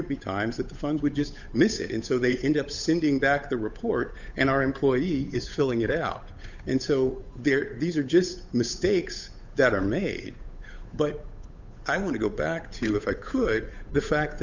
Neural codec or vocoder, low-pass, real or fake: codec, 16 kHz, 8 kbps, FunCodec, trained on Chinese and English, 25 frames a second; 7.2 kHz; fake